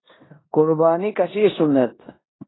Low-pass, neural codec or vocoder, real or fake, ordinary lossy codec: 7.2 kHz; codec, 16 kHz in and 24 kHz out, 0.9 kbps, LongCat-Audio-Codec, four codebook decoder; fake; AAC, 16 kbps